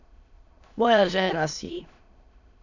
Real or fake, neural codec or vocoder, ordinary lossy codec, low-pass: fake; autoencoder, 22.05 kHz, a latent of 192 numbers a frame, VITS, trained on many speakers; none; 7.2 kHz